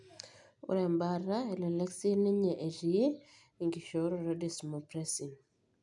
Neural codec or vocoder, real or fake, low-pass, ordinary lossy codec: none; real; 10.8 kHz; none